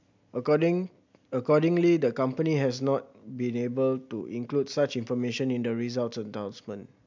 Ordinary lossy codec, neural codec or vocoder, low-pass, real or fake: MP3, 64 kbps; none; 7.2 kHz; real